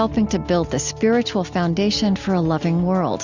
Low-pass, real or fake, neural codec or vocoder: 7.2 kHz; real; none